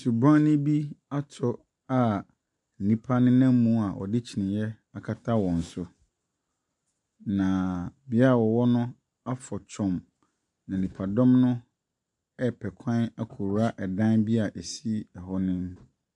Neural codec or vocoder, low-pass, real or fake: none; 10.8 kHz; real